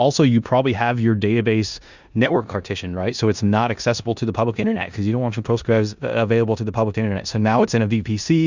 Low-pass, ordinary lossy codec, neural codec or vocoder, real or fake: 7.2 kHz; Opus, 64 kbps; codec, 16 kHz in and 24 kHz out, 0.9 kbps, LongCat-Audio-Codec, four codebook decoder; fake